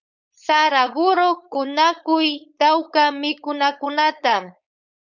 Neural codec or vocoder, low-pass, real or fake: codec, 16 kHz, 4.8 kbps, FACodec; 7.2 kHz; fake